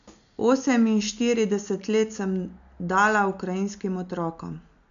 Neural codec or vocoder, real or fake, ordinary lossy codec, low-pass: none; real; none; 7.2 kHz